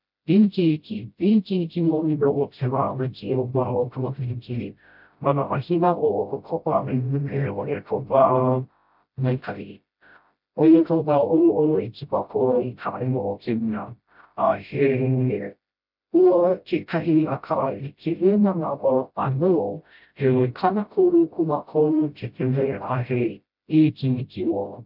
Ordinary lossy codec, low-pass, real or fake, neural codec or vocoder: MP3, 48 kbps; 5.4 kHz; fake; codec, 16 kHz, 0.5 kbps, FreqCodec, smaller model